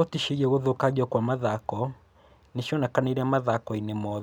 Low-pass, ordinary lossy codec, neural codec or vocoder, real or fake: none; none; none; real